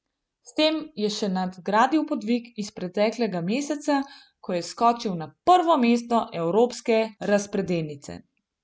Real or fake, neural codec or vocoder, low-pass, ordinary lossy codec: real; none; none; none